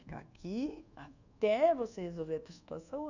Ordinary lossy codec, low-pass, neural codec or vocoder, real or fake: none; 7.2 kHz; codec, 24 kHz, 1.2 kbps, DualCodec; fake